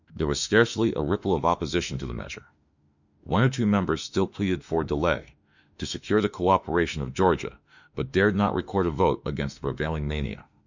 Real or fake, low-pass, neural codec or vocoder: fake; 7.2 kHz; autoencoder, 48 kHz, 32 numbers a frame, DAC-VAE, trained on Japanese speech